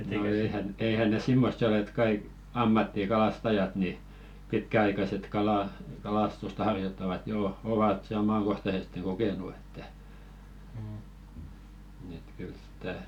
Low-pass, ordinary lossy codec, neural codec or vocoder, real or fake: 19.8 kHz; none; none; real